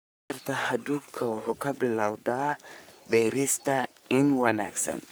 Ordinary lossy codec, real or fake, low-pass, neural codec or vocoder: none; fake; none; codec, 44.1 kHz, 3.4 kbps, Pupu-Codec